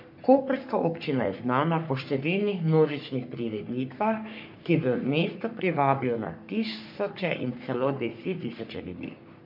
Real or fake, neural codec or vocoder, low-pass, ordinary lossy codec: fake; codec, 44.1 kHz, 3.4 kbps, Pupu-Codec; 5.4 kHz; MP3, 48 kbps